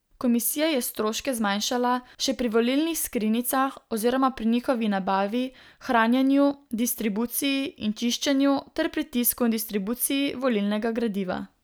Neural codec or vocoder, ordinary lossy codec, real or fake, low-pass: none; none; real; none